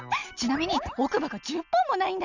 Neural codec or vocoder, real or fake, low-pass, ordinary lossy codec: none; real; 7.2 kHz; none